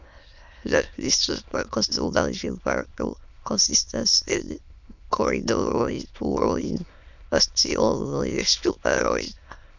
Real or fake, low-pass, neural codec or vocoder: fake; 7.2 kHz; autoencoder, 22.05 kHz, a latent of 192 numbers a frame, VITS, trained on many speakers